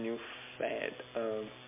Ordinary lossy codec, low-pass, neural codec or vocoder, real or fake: MP3, 32 kbps; 3.6 kHz; none; real